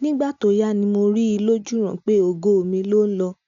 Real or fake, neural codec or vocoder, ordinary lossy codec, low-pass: real; none; none; 7.2 kHz